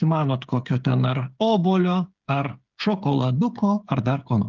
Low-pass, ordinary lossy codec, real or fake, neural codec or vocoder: 7.2 kHz; Opus, 32 kbps; fake; codec, 16 kHz, 8 kbps, FreqCodec, smaller model